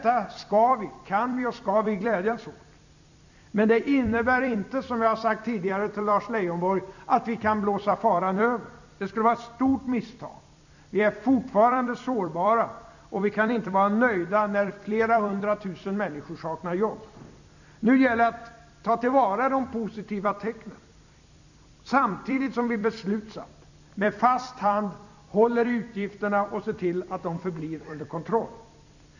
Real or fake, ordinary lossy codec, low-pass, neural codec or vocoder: fake; none; 7.2 kHz; vocoder, 44.1 kHz, 128 mel bands every 256 samples, BigVGAN v2